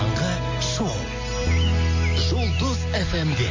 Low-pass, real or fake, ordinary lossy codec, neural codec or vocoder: 7.2 kHz; real; MP3, 32 kbps; none